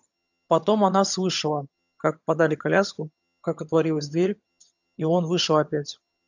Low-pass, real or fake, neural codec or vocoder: 7.2 kHz; fake; vocoder, 22.05 kHz, 80 mel bands, HiFi-GAN